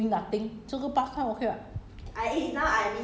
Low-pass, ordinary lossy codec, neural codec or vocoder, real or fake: none; none; none; real